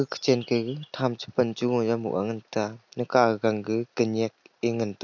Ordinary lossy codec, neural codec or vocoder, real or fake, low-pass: none; none; real; 7.2 kHz